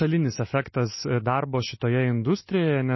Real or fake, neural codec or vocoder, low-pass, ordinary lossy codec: real; none; 7.2 kHz; MP3, 24 kbps